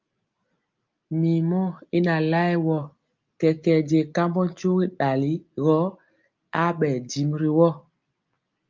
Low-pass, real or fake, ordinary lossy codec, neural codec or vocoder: 7.2 kHz; real; Opus, 24 kbps; none